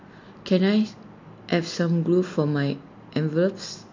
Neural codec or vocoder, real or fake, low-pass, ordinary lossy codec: none; real; 7.2 kHz; MP3, 48 kbps